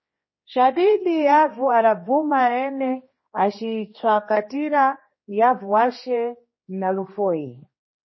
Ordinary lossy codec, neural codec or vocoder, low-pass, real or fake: MP3, 24 kbps; codec, 16 kHz, 2 kbps, X-Codec, HuBERT features, trained on general audio; 7.2 kHz; fake